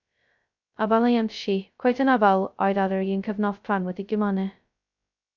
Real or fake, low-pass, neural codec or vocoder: fake; 7.2 kHz; codec, 16 kHz, 0.2 kbps, FocalCodec